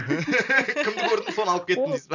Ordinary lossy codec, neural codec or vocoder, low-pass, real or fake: none; none; 7.2 kHz; real